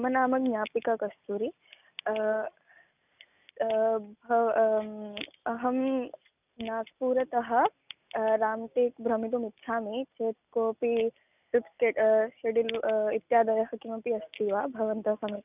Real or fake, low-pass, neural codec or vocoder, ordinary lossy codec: real; 3.6 kHz; none; none